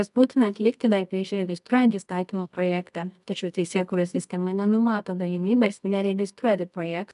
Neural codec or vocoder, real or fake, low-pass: codec, 24 kHz, 0.9 kbps, WavTokenizer, medium music audio release; fake; 10.8 kHz